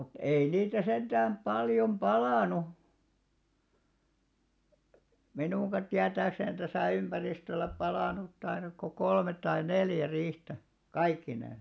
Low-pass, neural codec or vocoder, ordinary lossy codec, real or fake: none; none; none; real